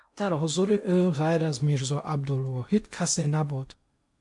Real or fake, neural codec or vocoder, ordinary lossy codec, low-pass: fake; codec, 16 kHz in and 24 kHz out, 0.8 kbps, FocalCodec, streaming, 65536 codes; MP3, 64 kbps; 10.8 kHz